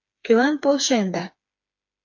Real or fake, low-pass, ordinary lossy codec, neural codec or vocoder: fake; 7.2 kHz; AAC, 48 kbps; codec, 16 kHz, 4 kbps, FreqCodec, smaller model